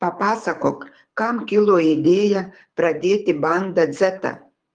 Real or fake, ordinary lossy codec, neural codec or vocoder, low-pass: fake; Opus, 32 kbps; codec, 24 kHz, 6 kbps, HILCodec; 9.9 kHz